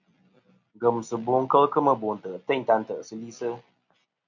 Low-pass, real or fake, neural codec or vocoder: 7.2 kHz; real; none